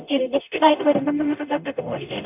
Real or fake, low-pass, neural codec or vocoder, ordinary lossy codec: fake; 3.6 kHz; codec, 44.1 kHz, 0.9 kbps, DAC; none